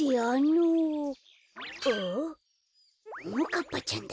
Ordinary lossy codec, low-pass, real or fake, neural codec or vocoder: none; none; real; none